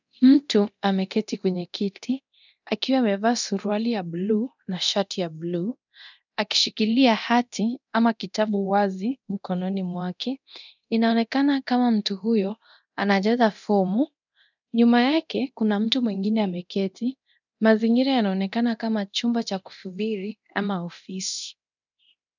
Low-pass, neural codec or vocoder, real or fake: 7.2 kHz; codec, 24 kHz, 0.9 kbps, DualCodec; fake